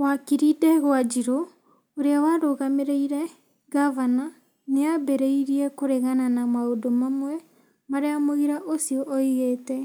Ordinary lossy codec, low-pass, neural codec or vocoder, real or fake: none; none; none; real